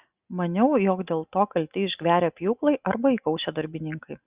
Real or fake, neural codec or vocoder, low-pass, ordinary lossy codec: real; none; 3.6 kHz; Opus, 64 kbps